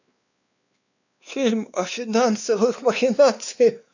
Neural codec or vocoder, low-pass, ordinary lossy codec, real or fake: codec, 16 kHz, 2 kbps, X-Codec, WavLM features, trained on Multilingual LibriSpeech; 7.2 kHz; none; fake